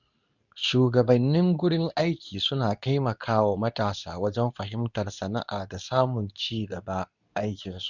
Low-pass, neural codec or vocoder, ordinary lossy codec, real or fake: 7.2 kHz; codec, 24 kHz, 0.9 kbps, WavTokenizer, medium speech release version 2; none; fake